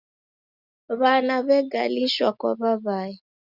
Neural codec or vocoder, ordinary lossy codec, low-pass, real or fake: none; Opus, 64 kbps; 5.4 kHz; real